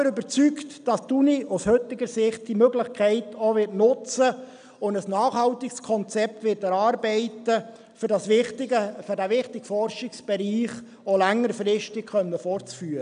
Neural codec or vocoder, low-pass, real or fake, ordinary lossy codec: none; 9.9 kHz; real; none